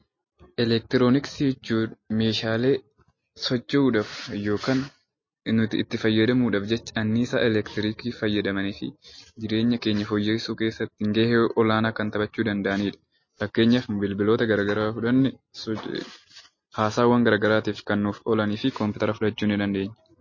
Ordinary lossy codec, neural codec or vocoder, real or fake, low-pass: MP3, 32 kbps; none; real; 7.2 kHz